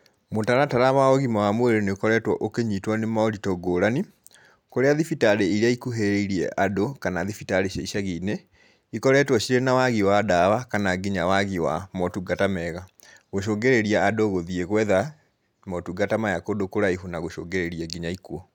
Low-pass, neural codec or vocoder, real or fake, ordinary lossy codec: 19.8 kHz; none; real; none